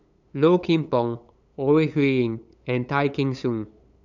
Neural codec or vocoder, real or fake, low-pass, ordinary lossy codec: codec, 16 kHz, 8 kbps, FunCodec, trained on LibriTTS, 25 frames a second; fake; 7.2 kHz; none